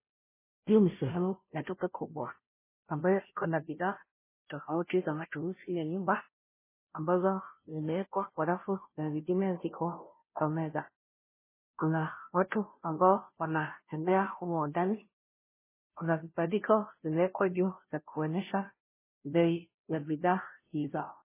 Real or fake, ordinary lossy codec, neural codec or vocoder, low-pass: fake; MP3, 16 kbps; codec, 16 kHz, 0.5 kbps, FunCodec, trained on Chinese and English, 25 frames a second; 3.6 kHz